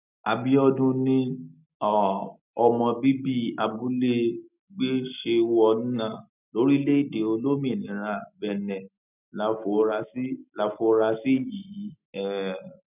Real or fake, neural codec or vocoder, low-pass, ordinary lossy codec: real; none; 3.6 kHz; none